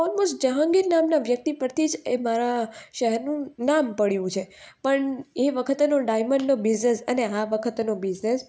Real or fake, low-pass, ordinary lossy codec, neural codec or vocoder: real; none; none; none